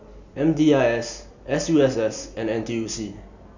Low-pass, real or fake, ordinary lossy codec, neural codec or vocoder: 7.2 kHz; real; none; none